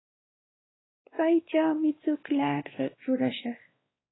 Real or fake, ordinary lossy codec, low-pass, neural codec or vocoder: fake; AAC, 16 kbps; 7.2 kHz; codec, 16 kHz, 2 kbps, X-Codec, WavLM features, trained on Multilingual LibriSpeech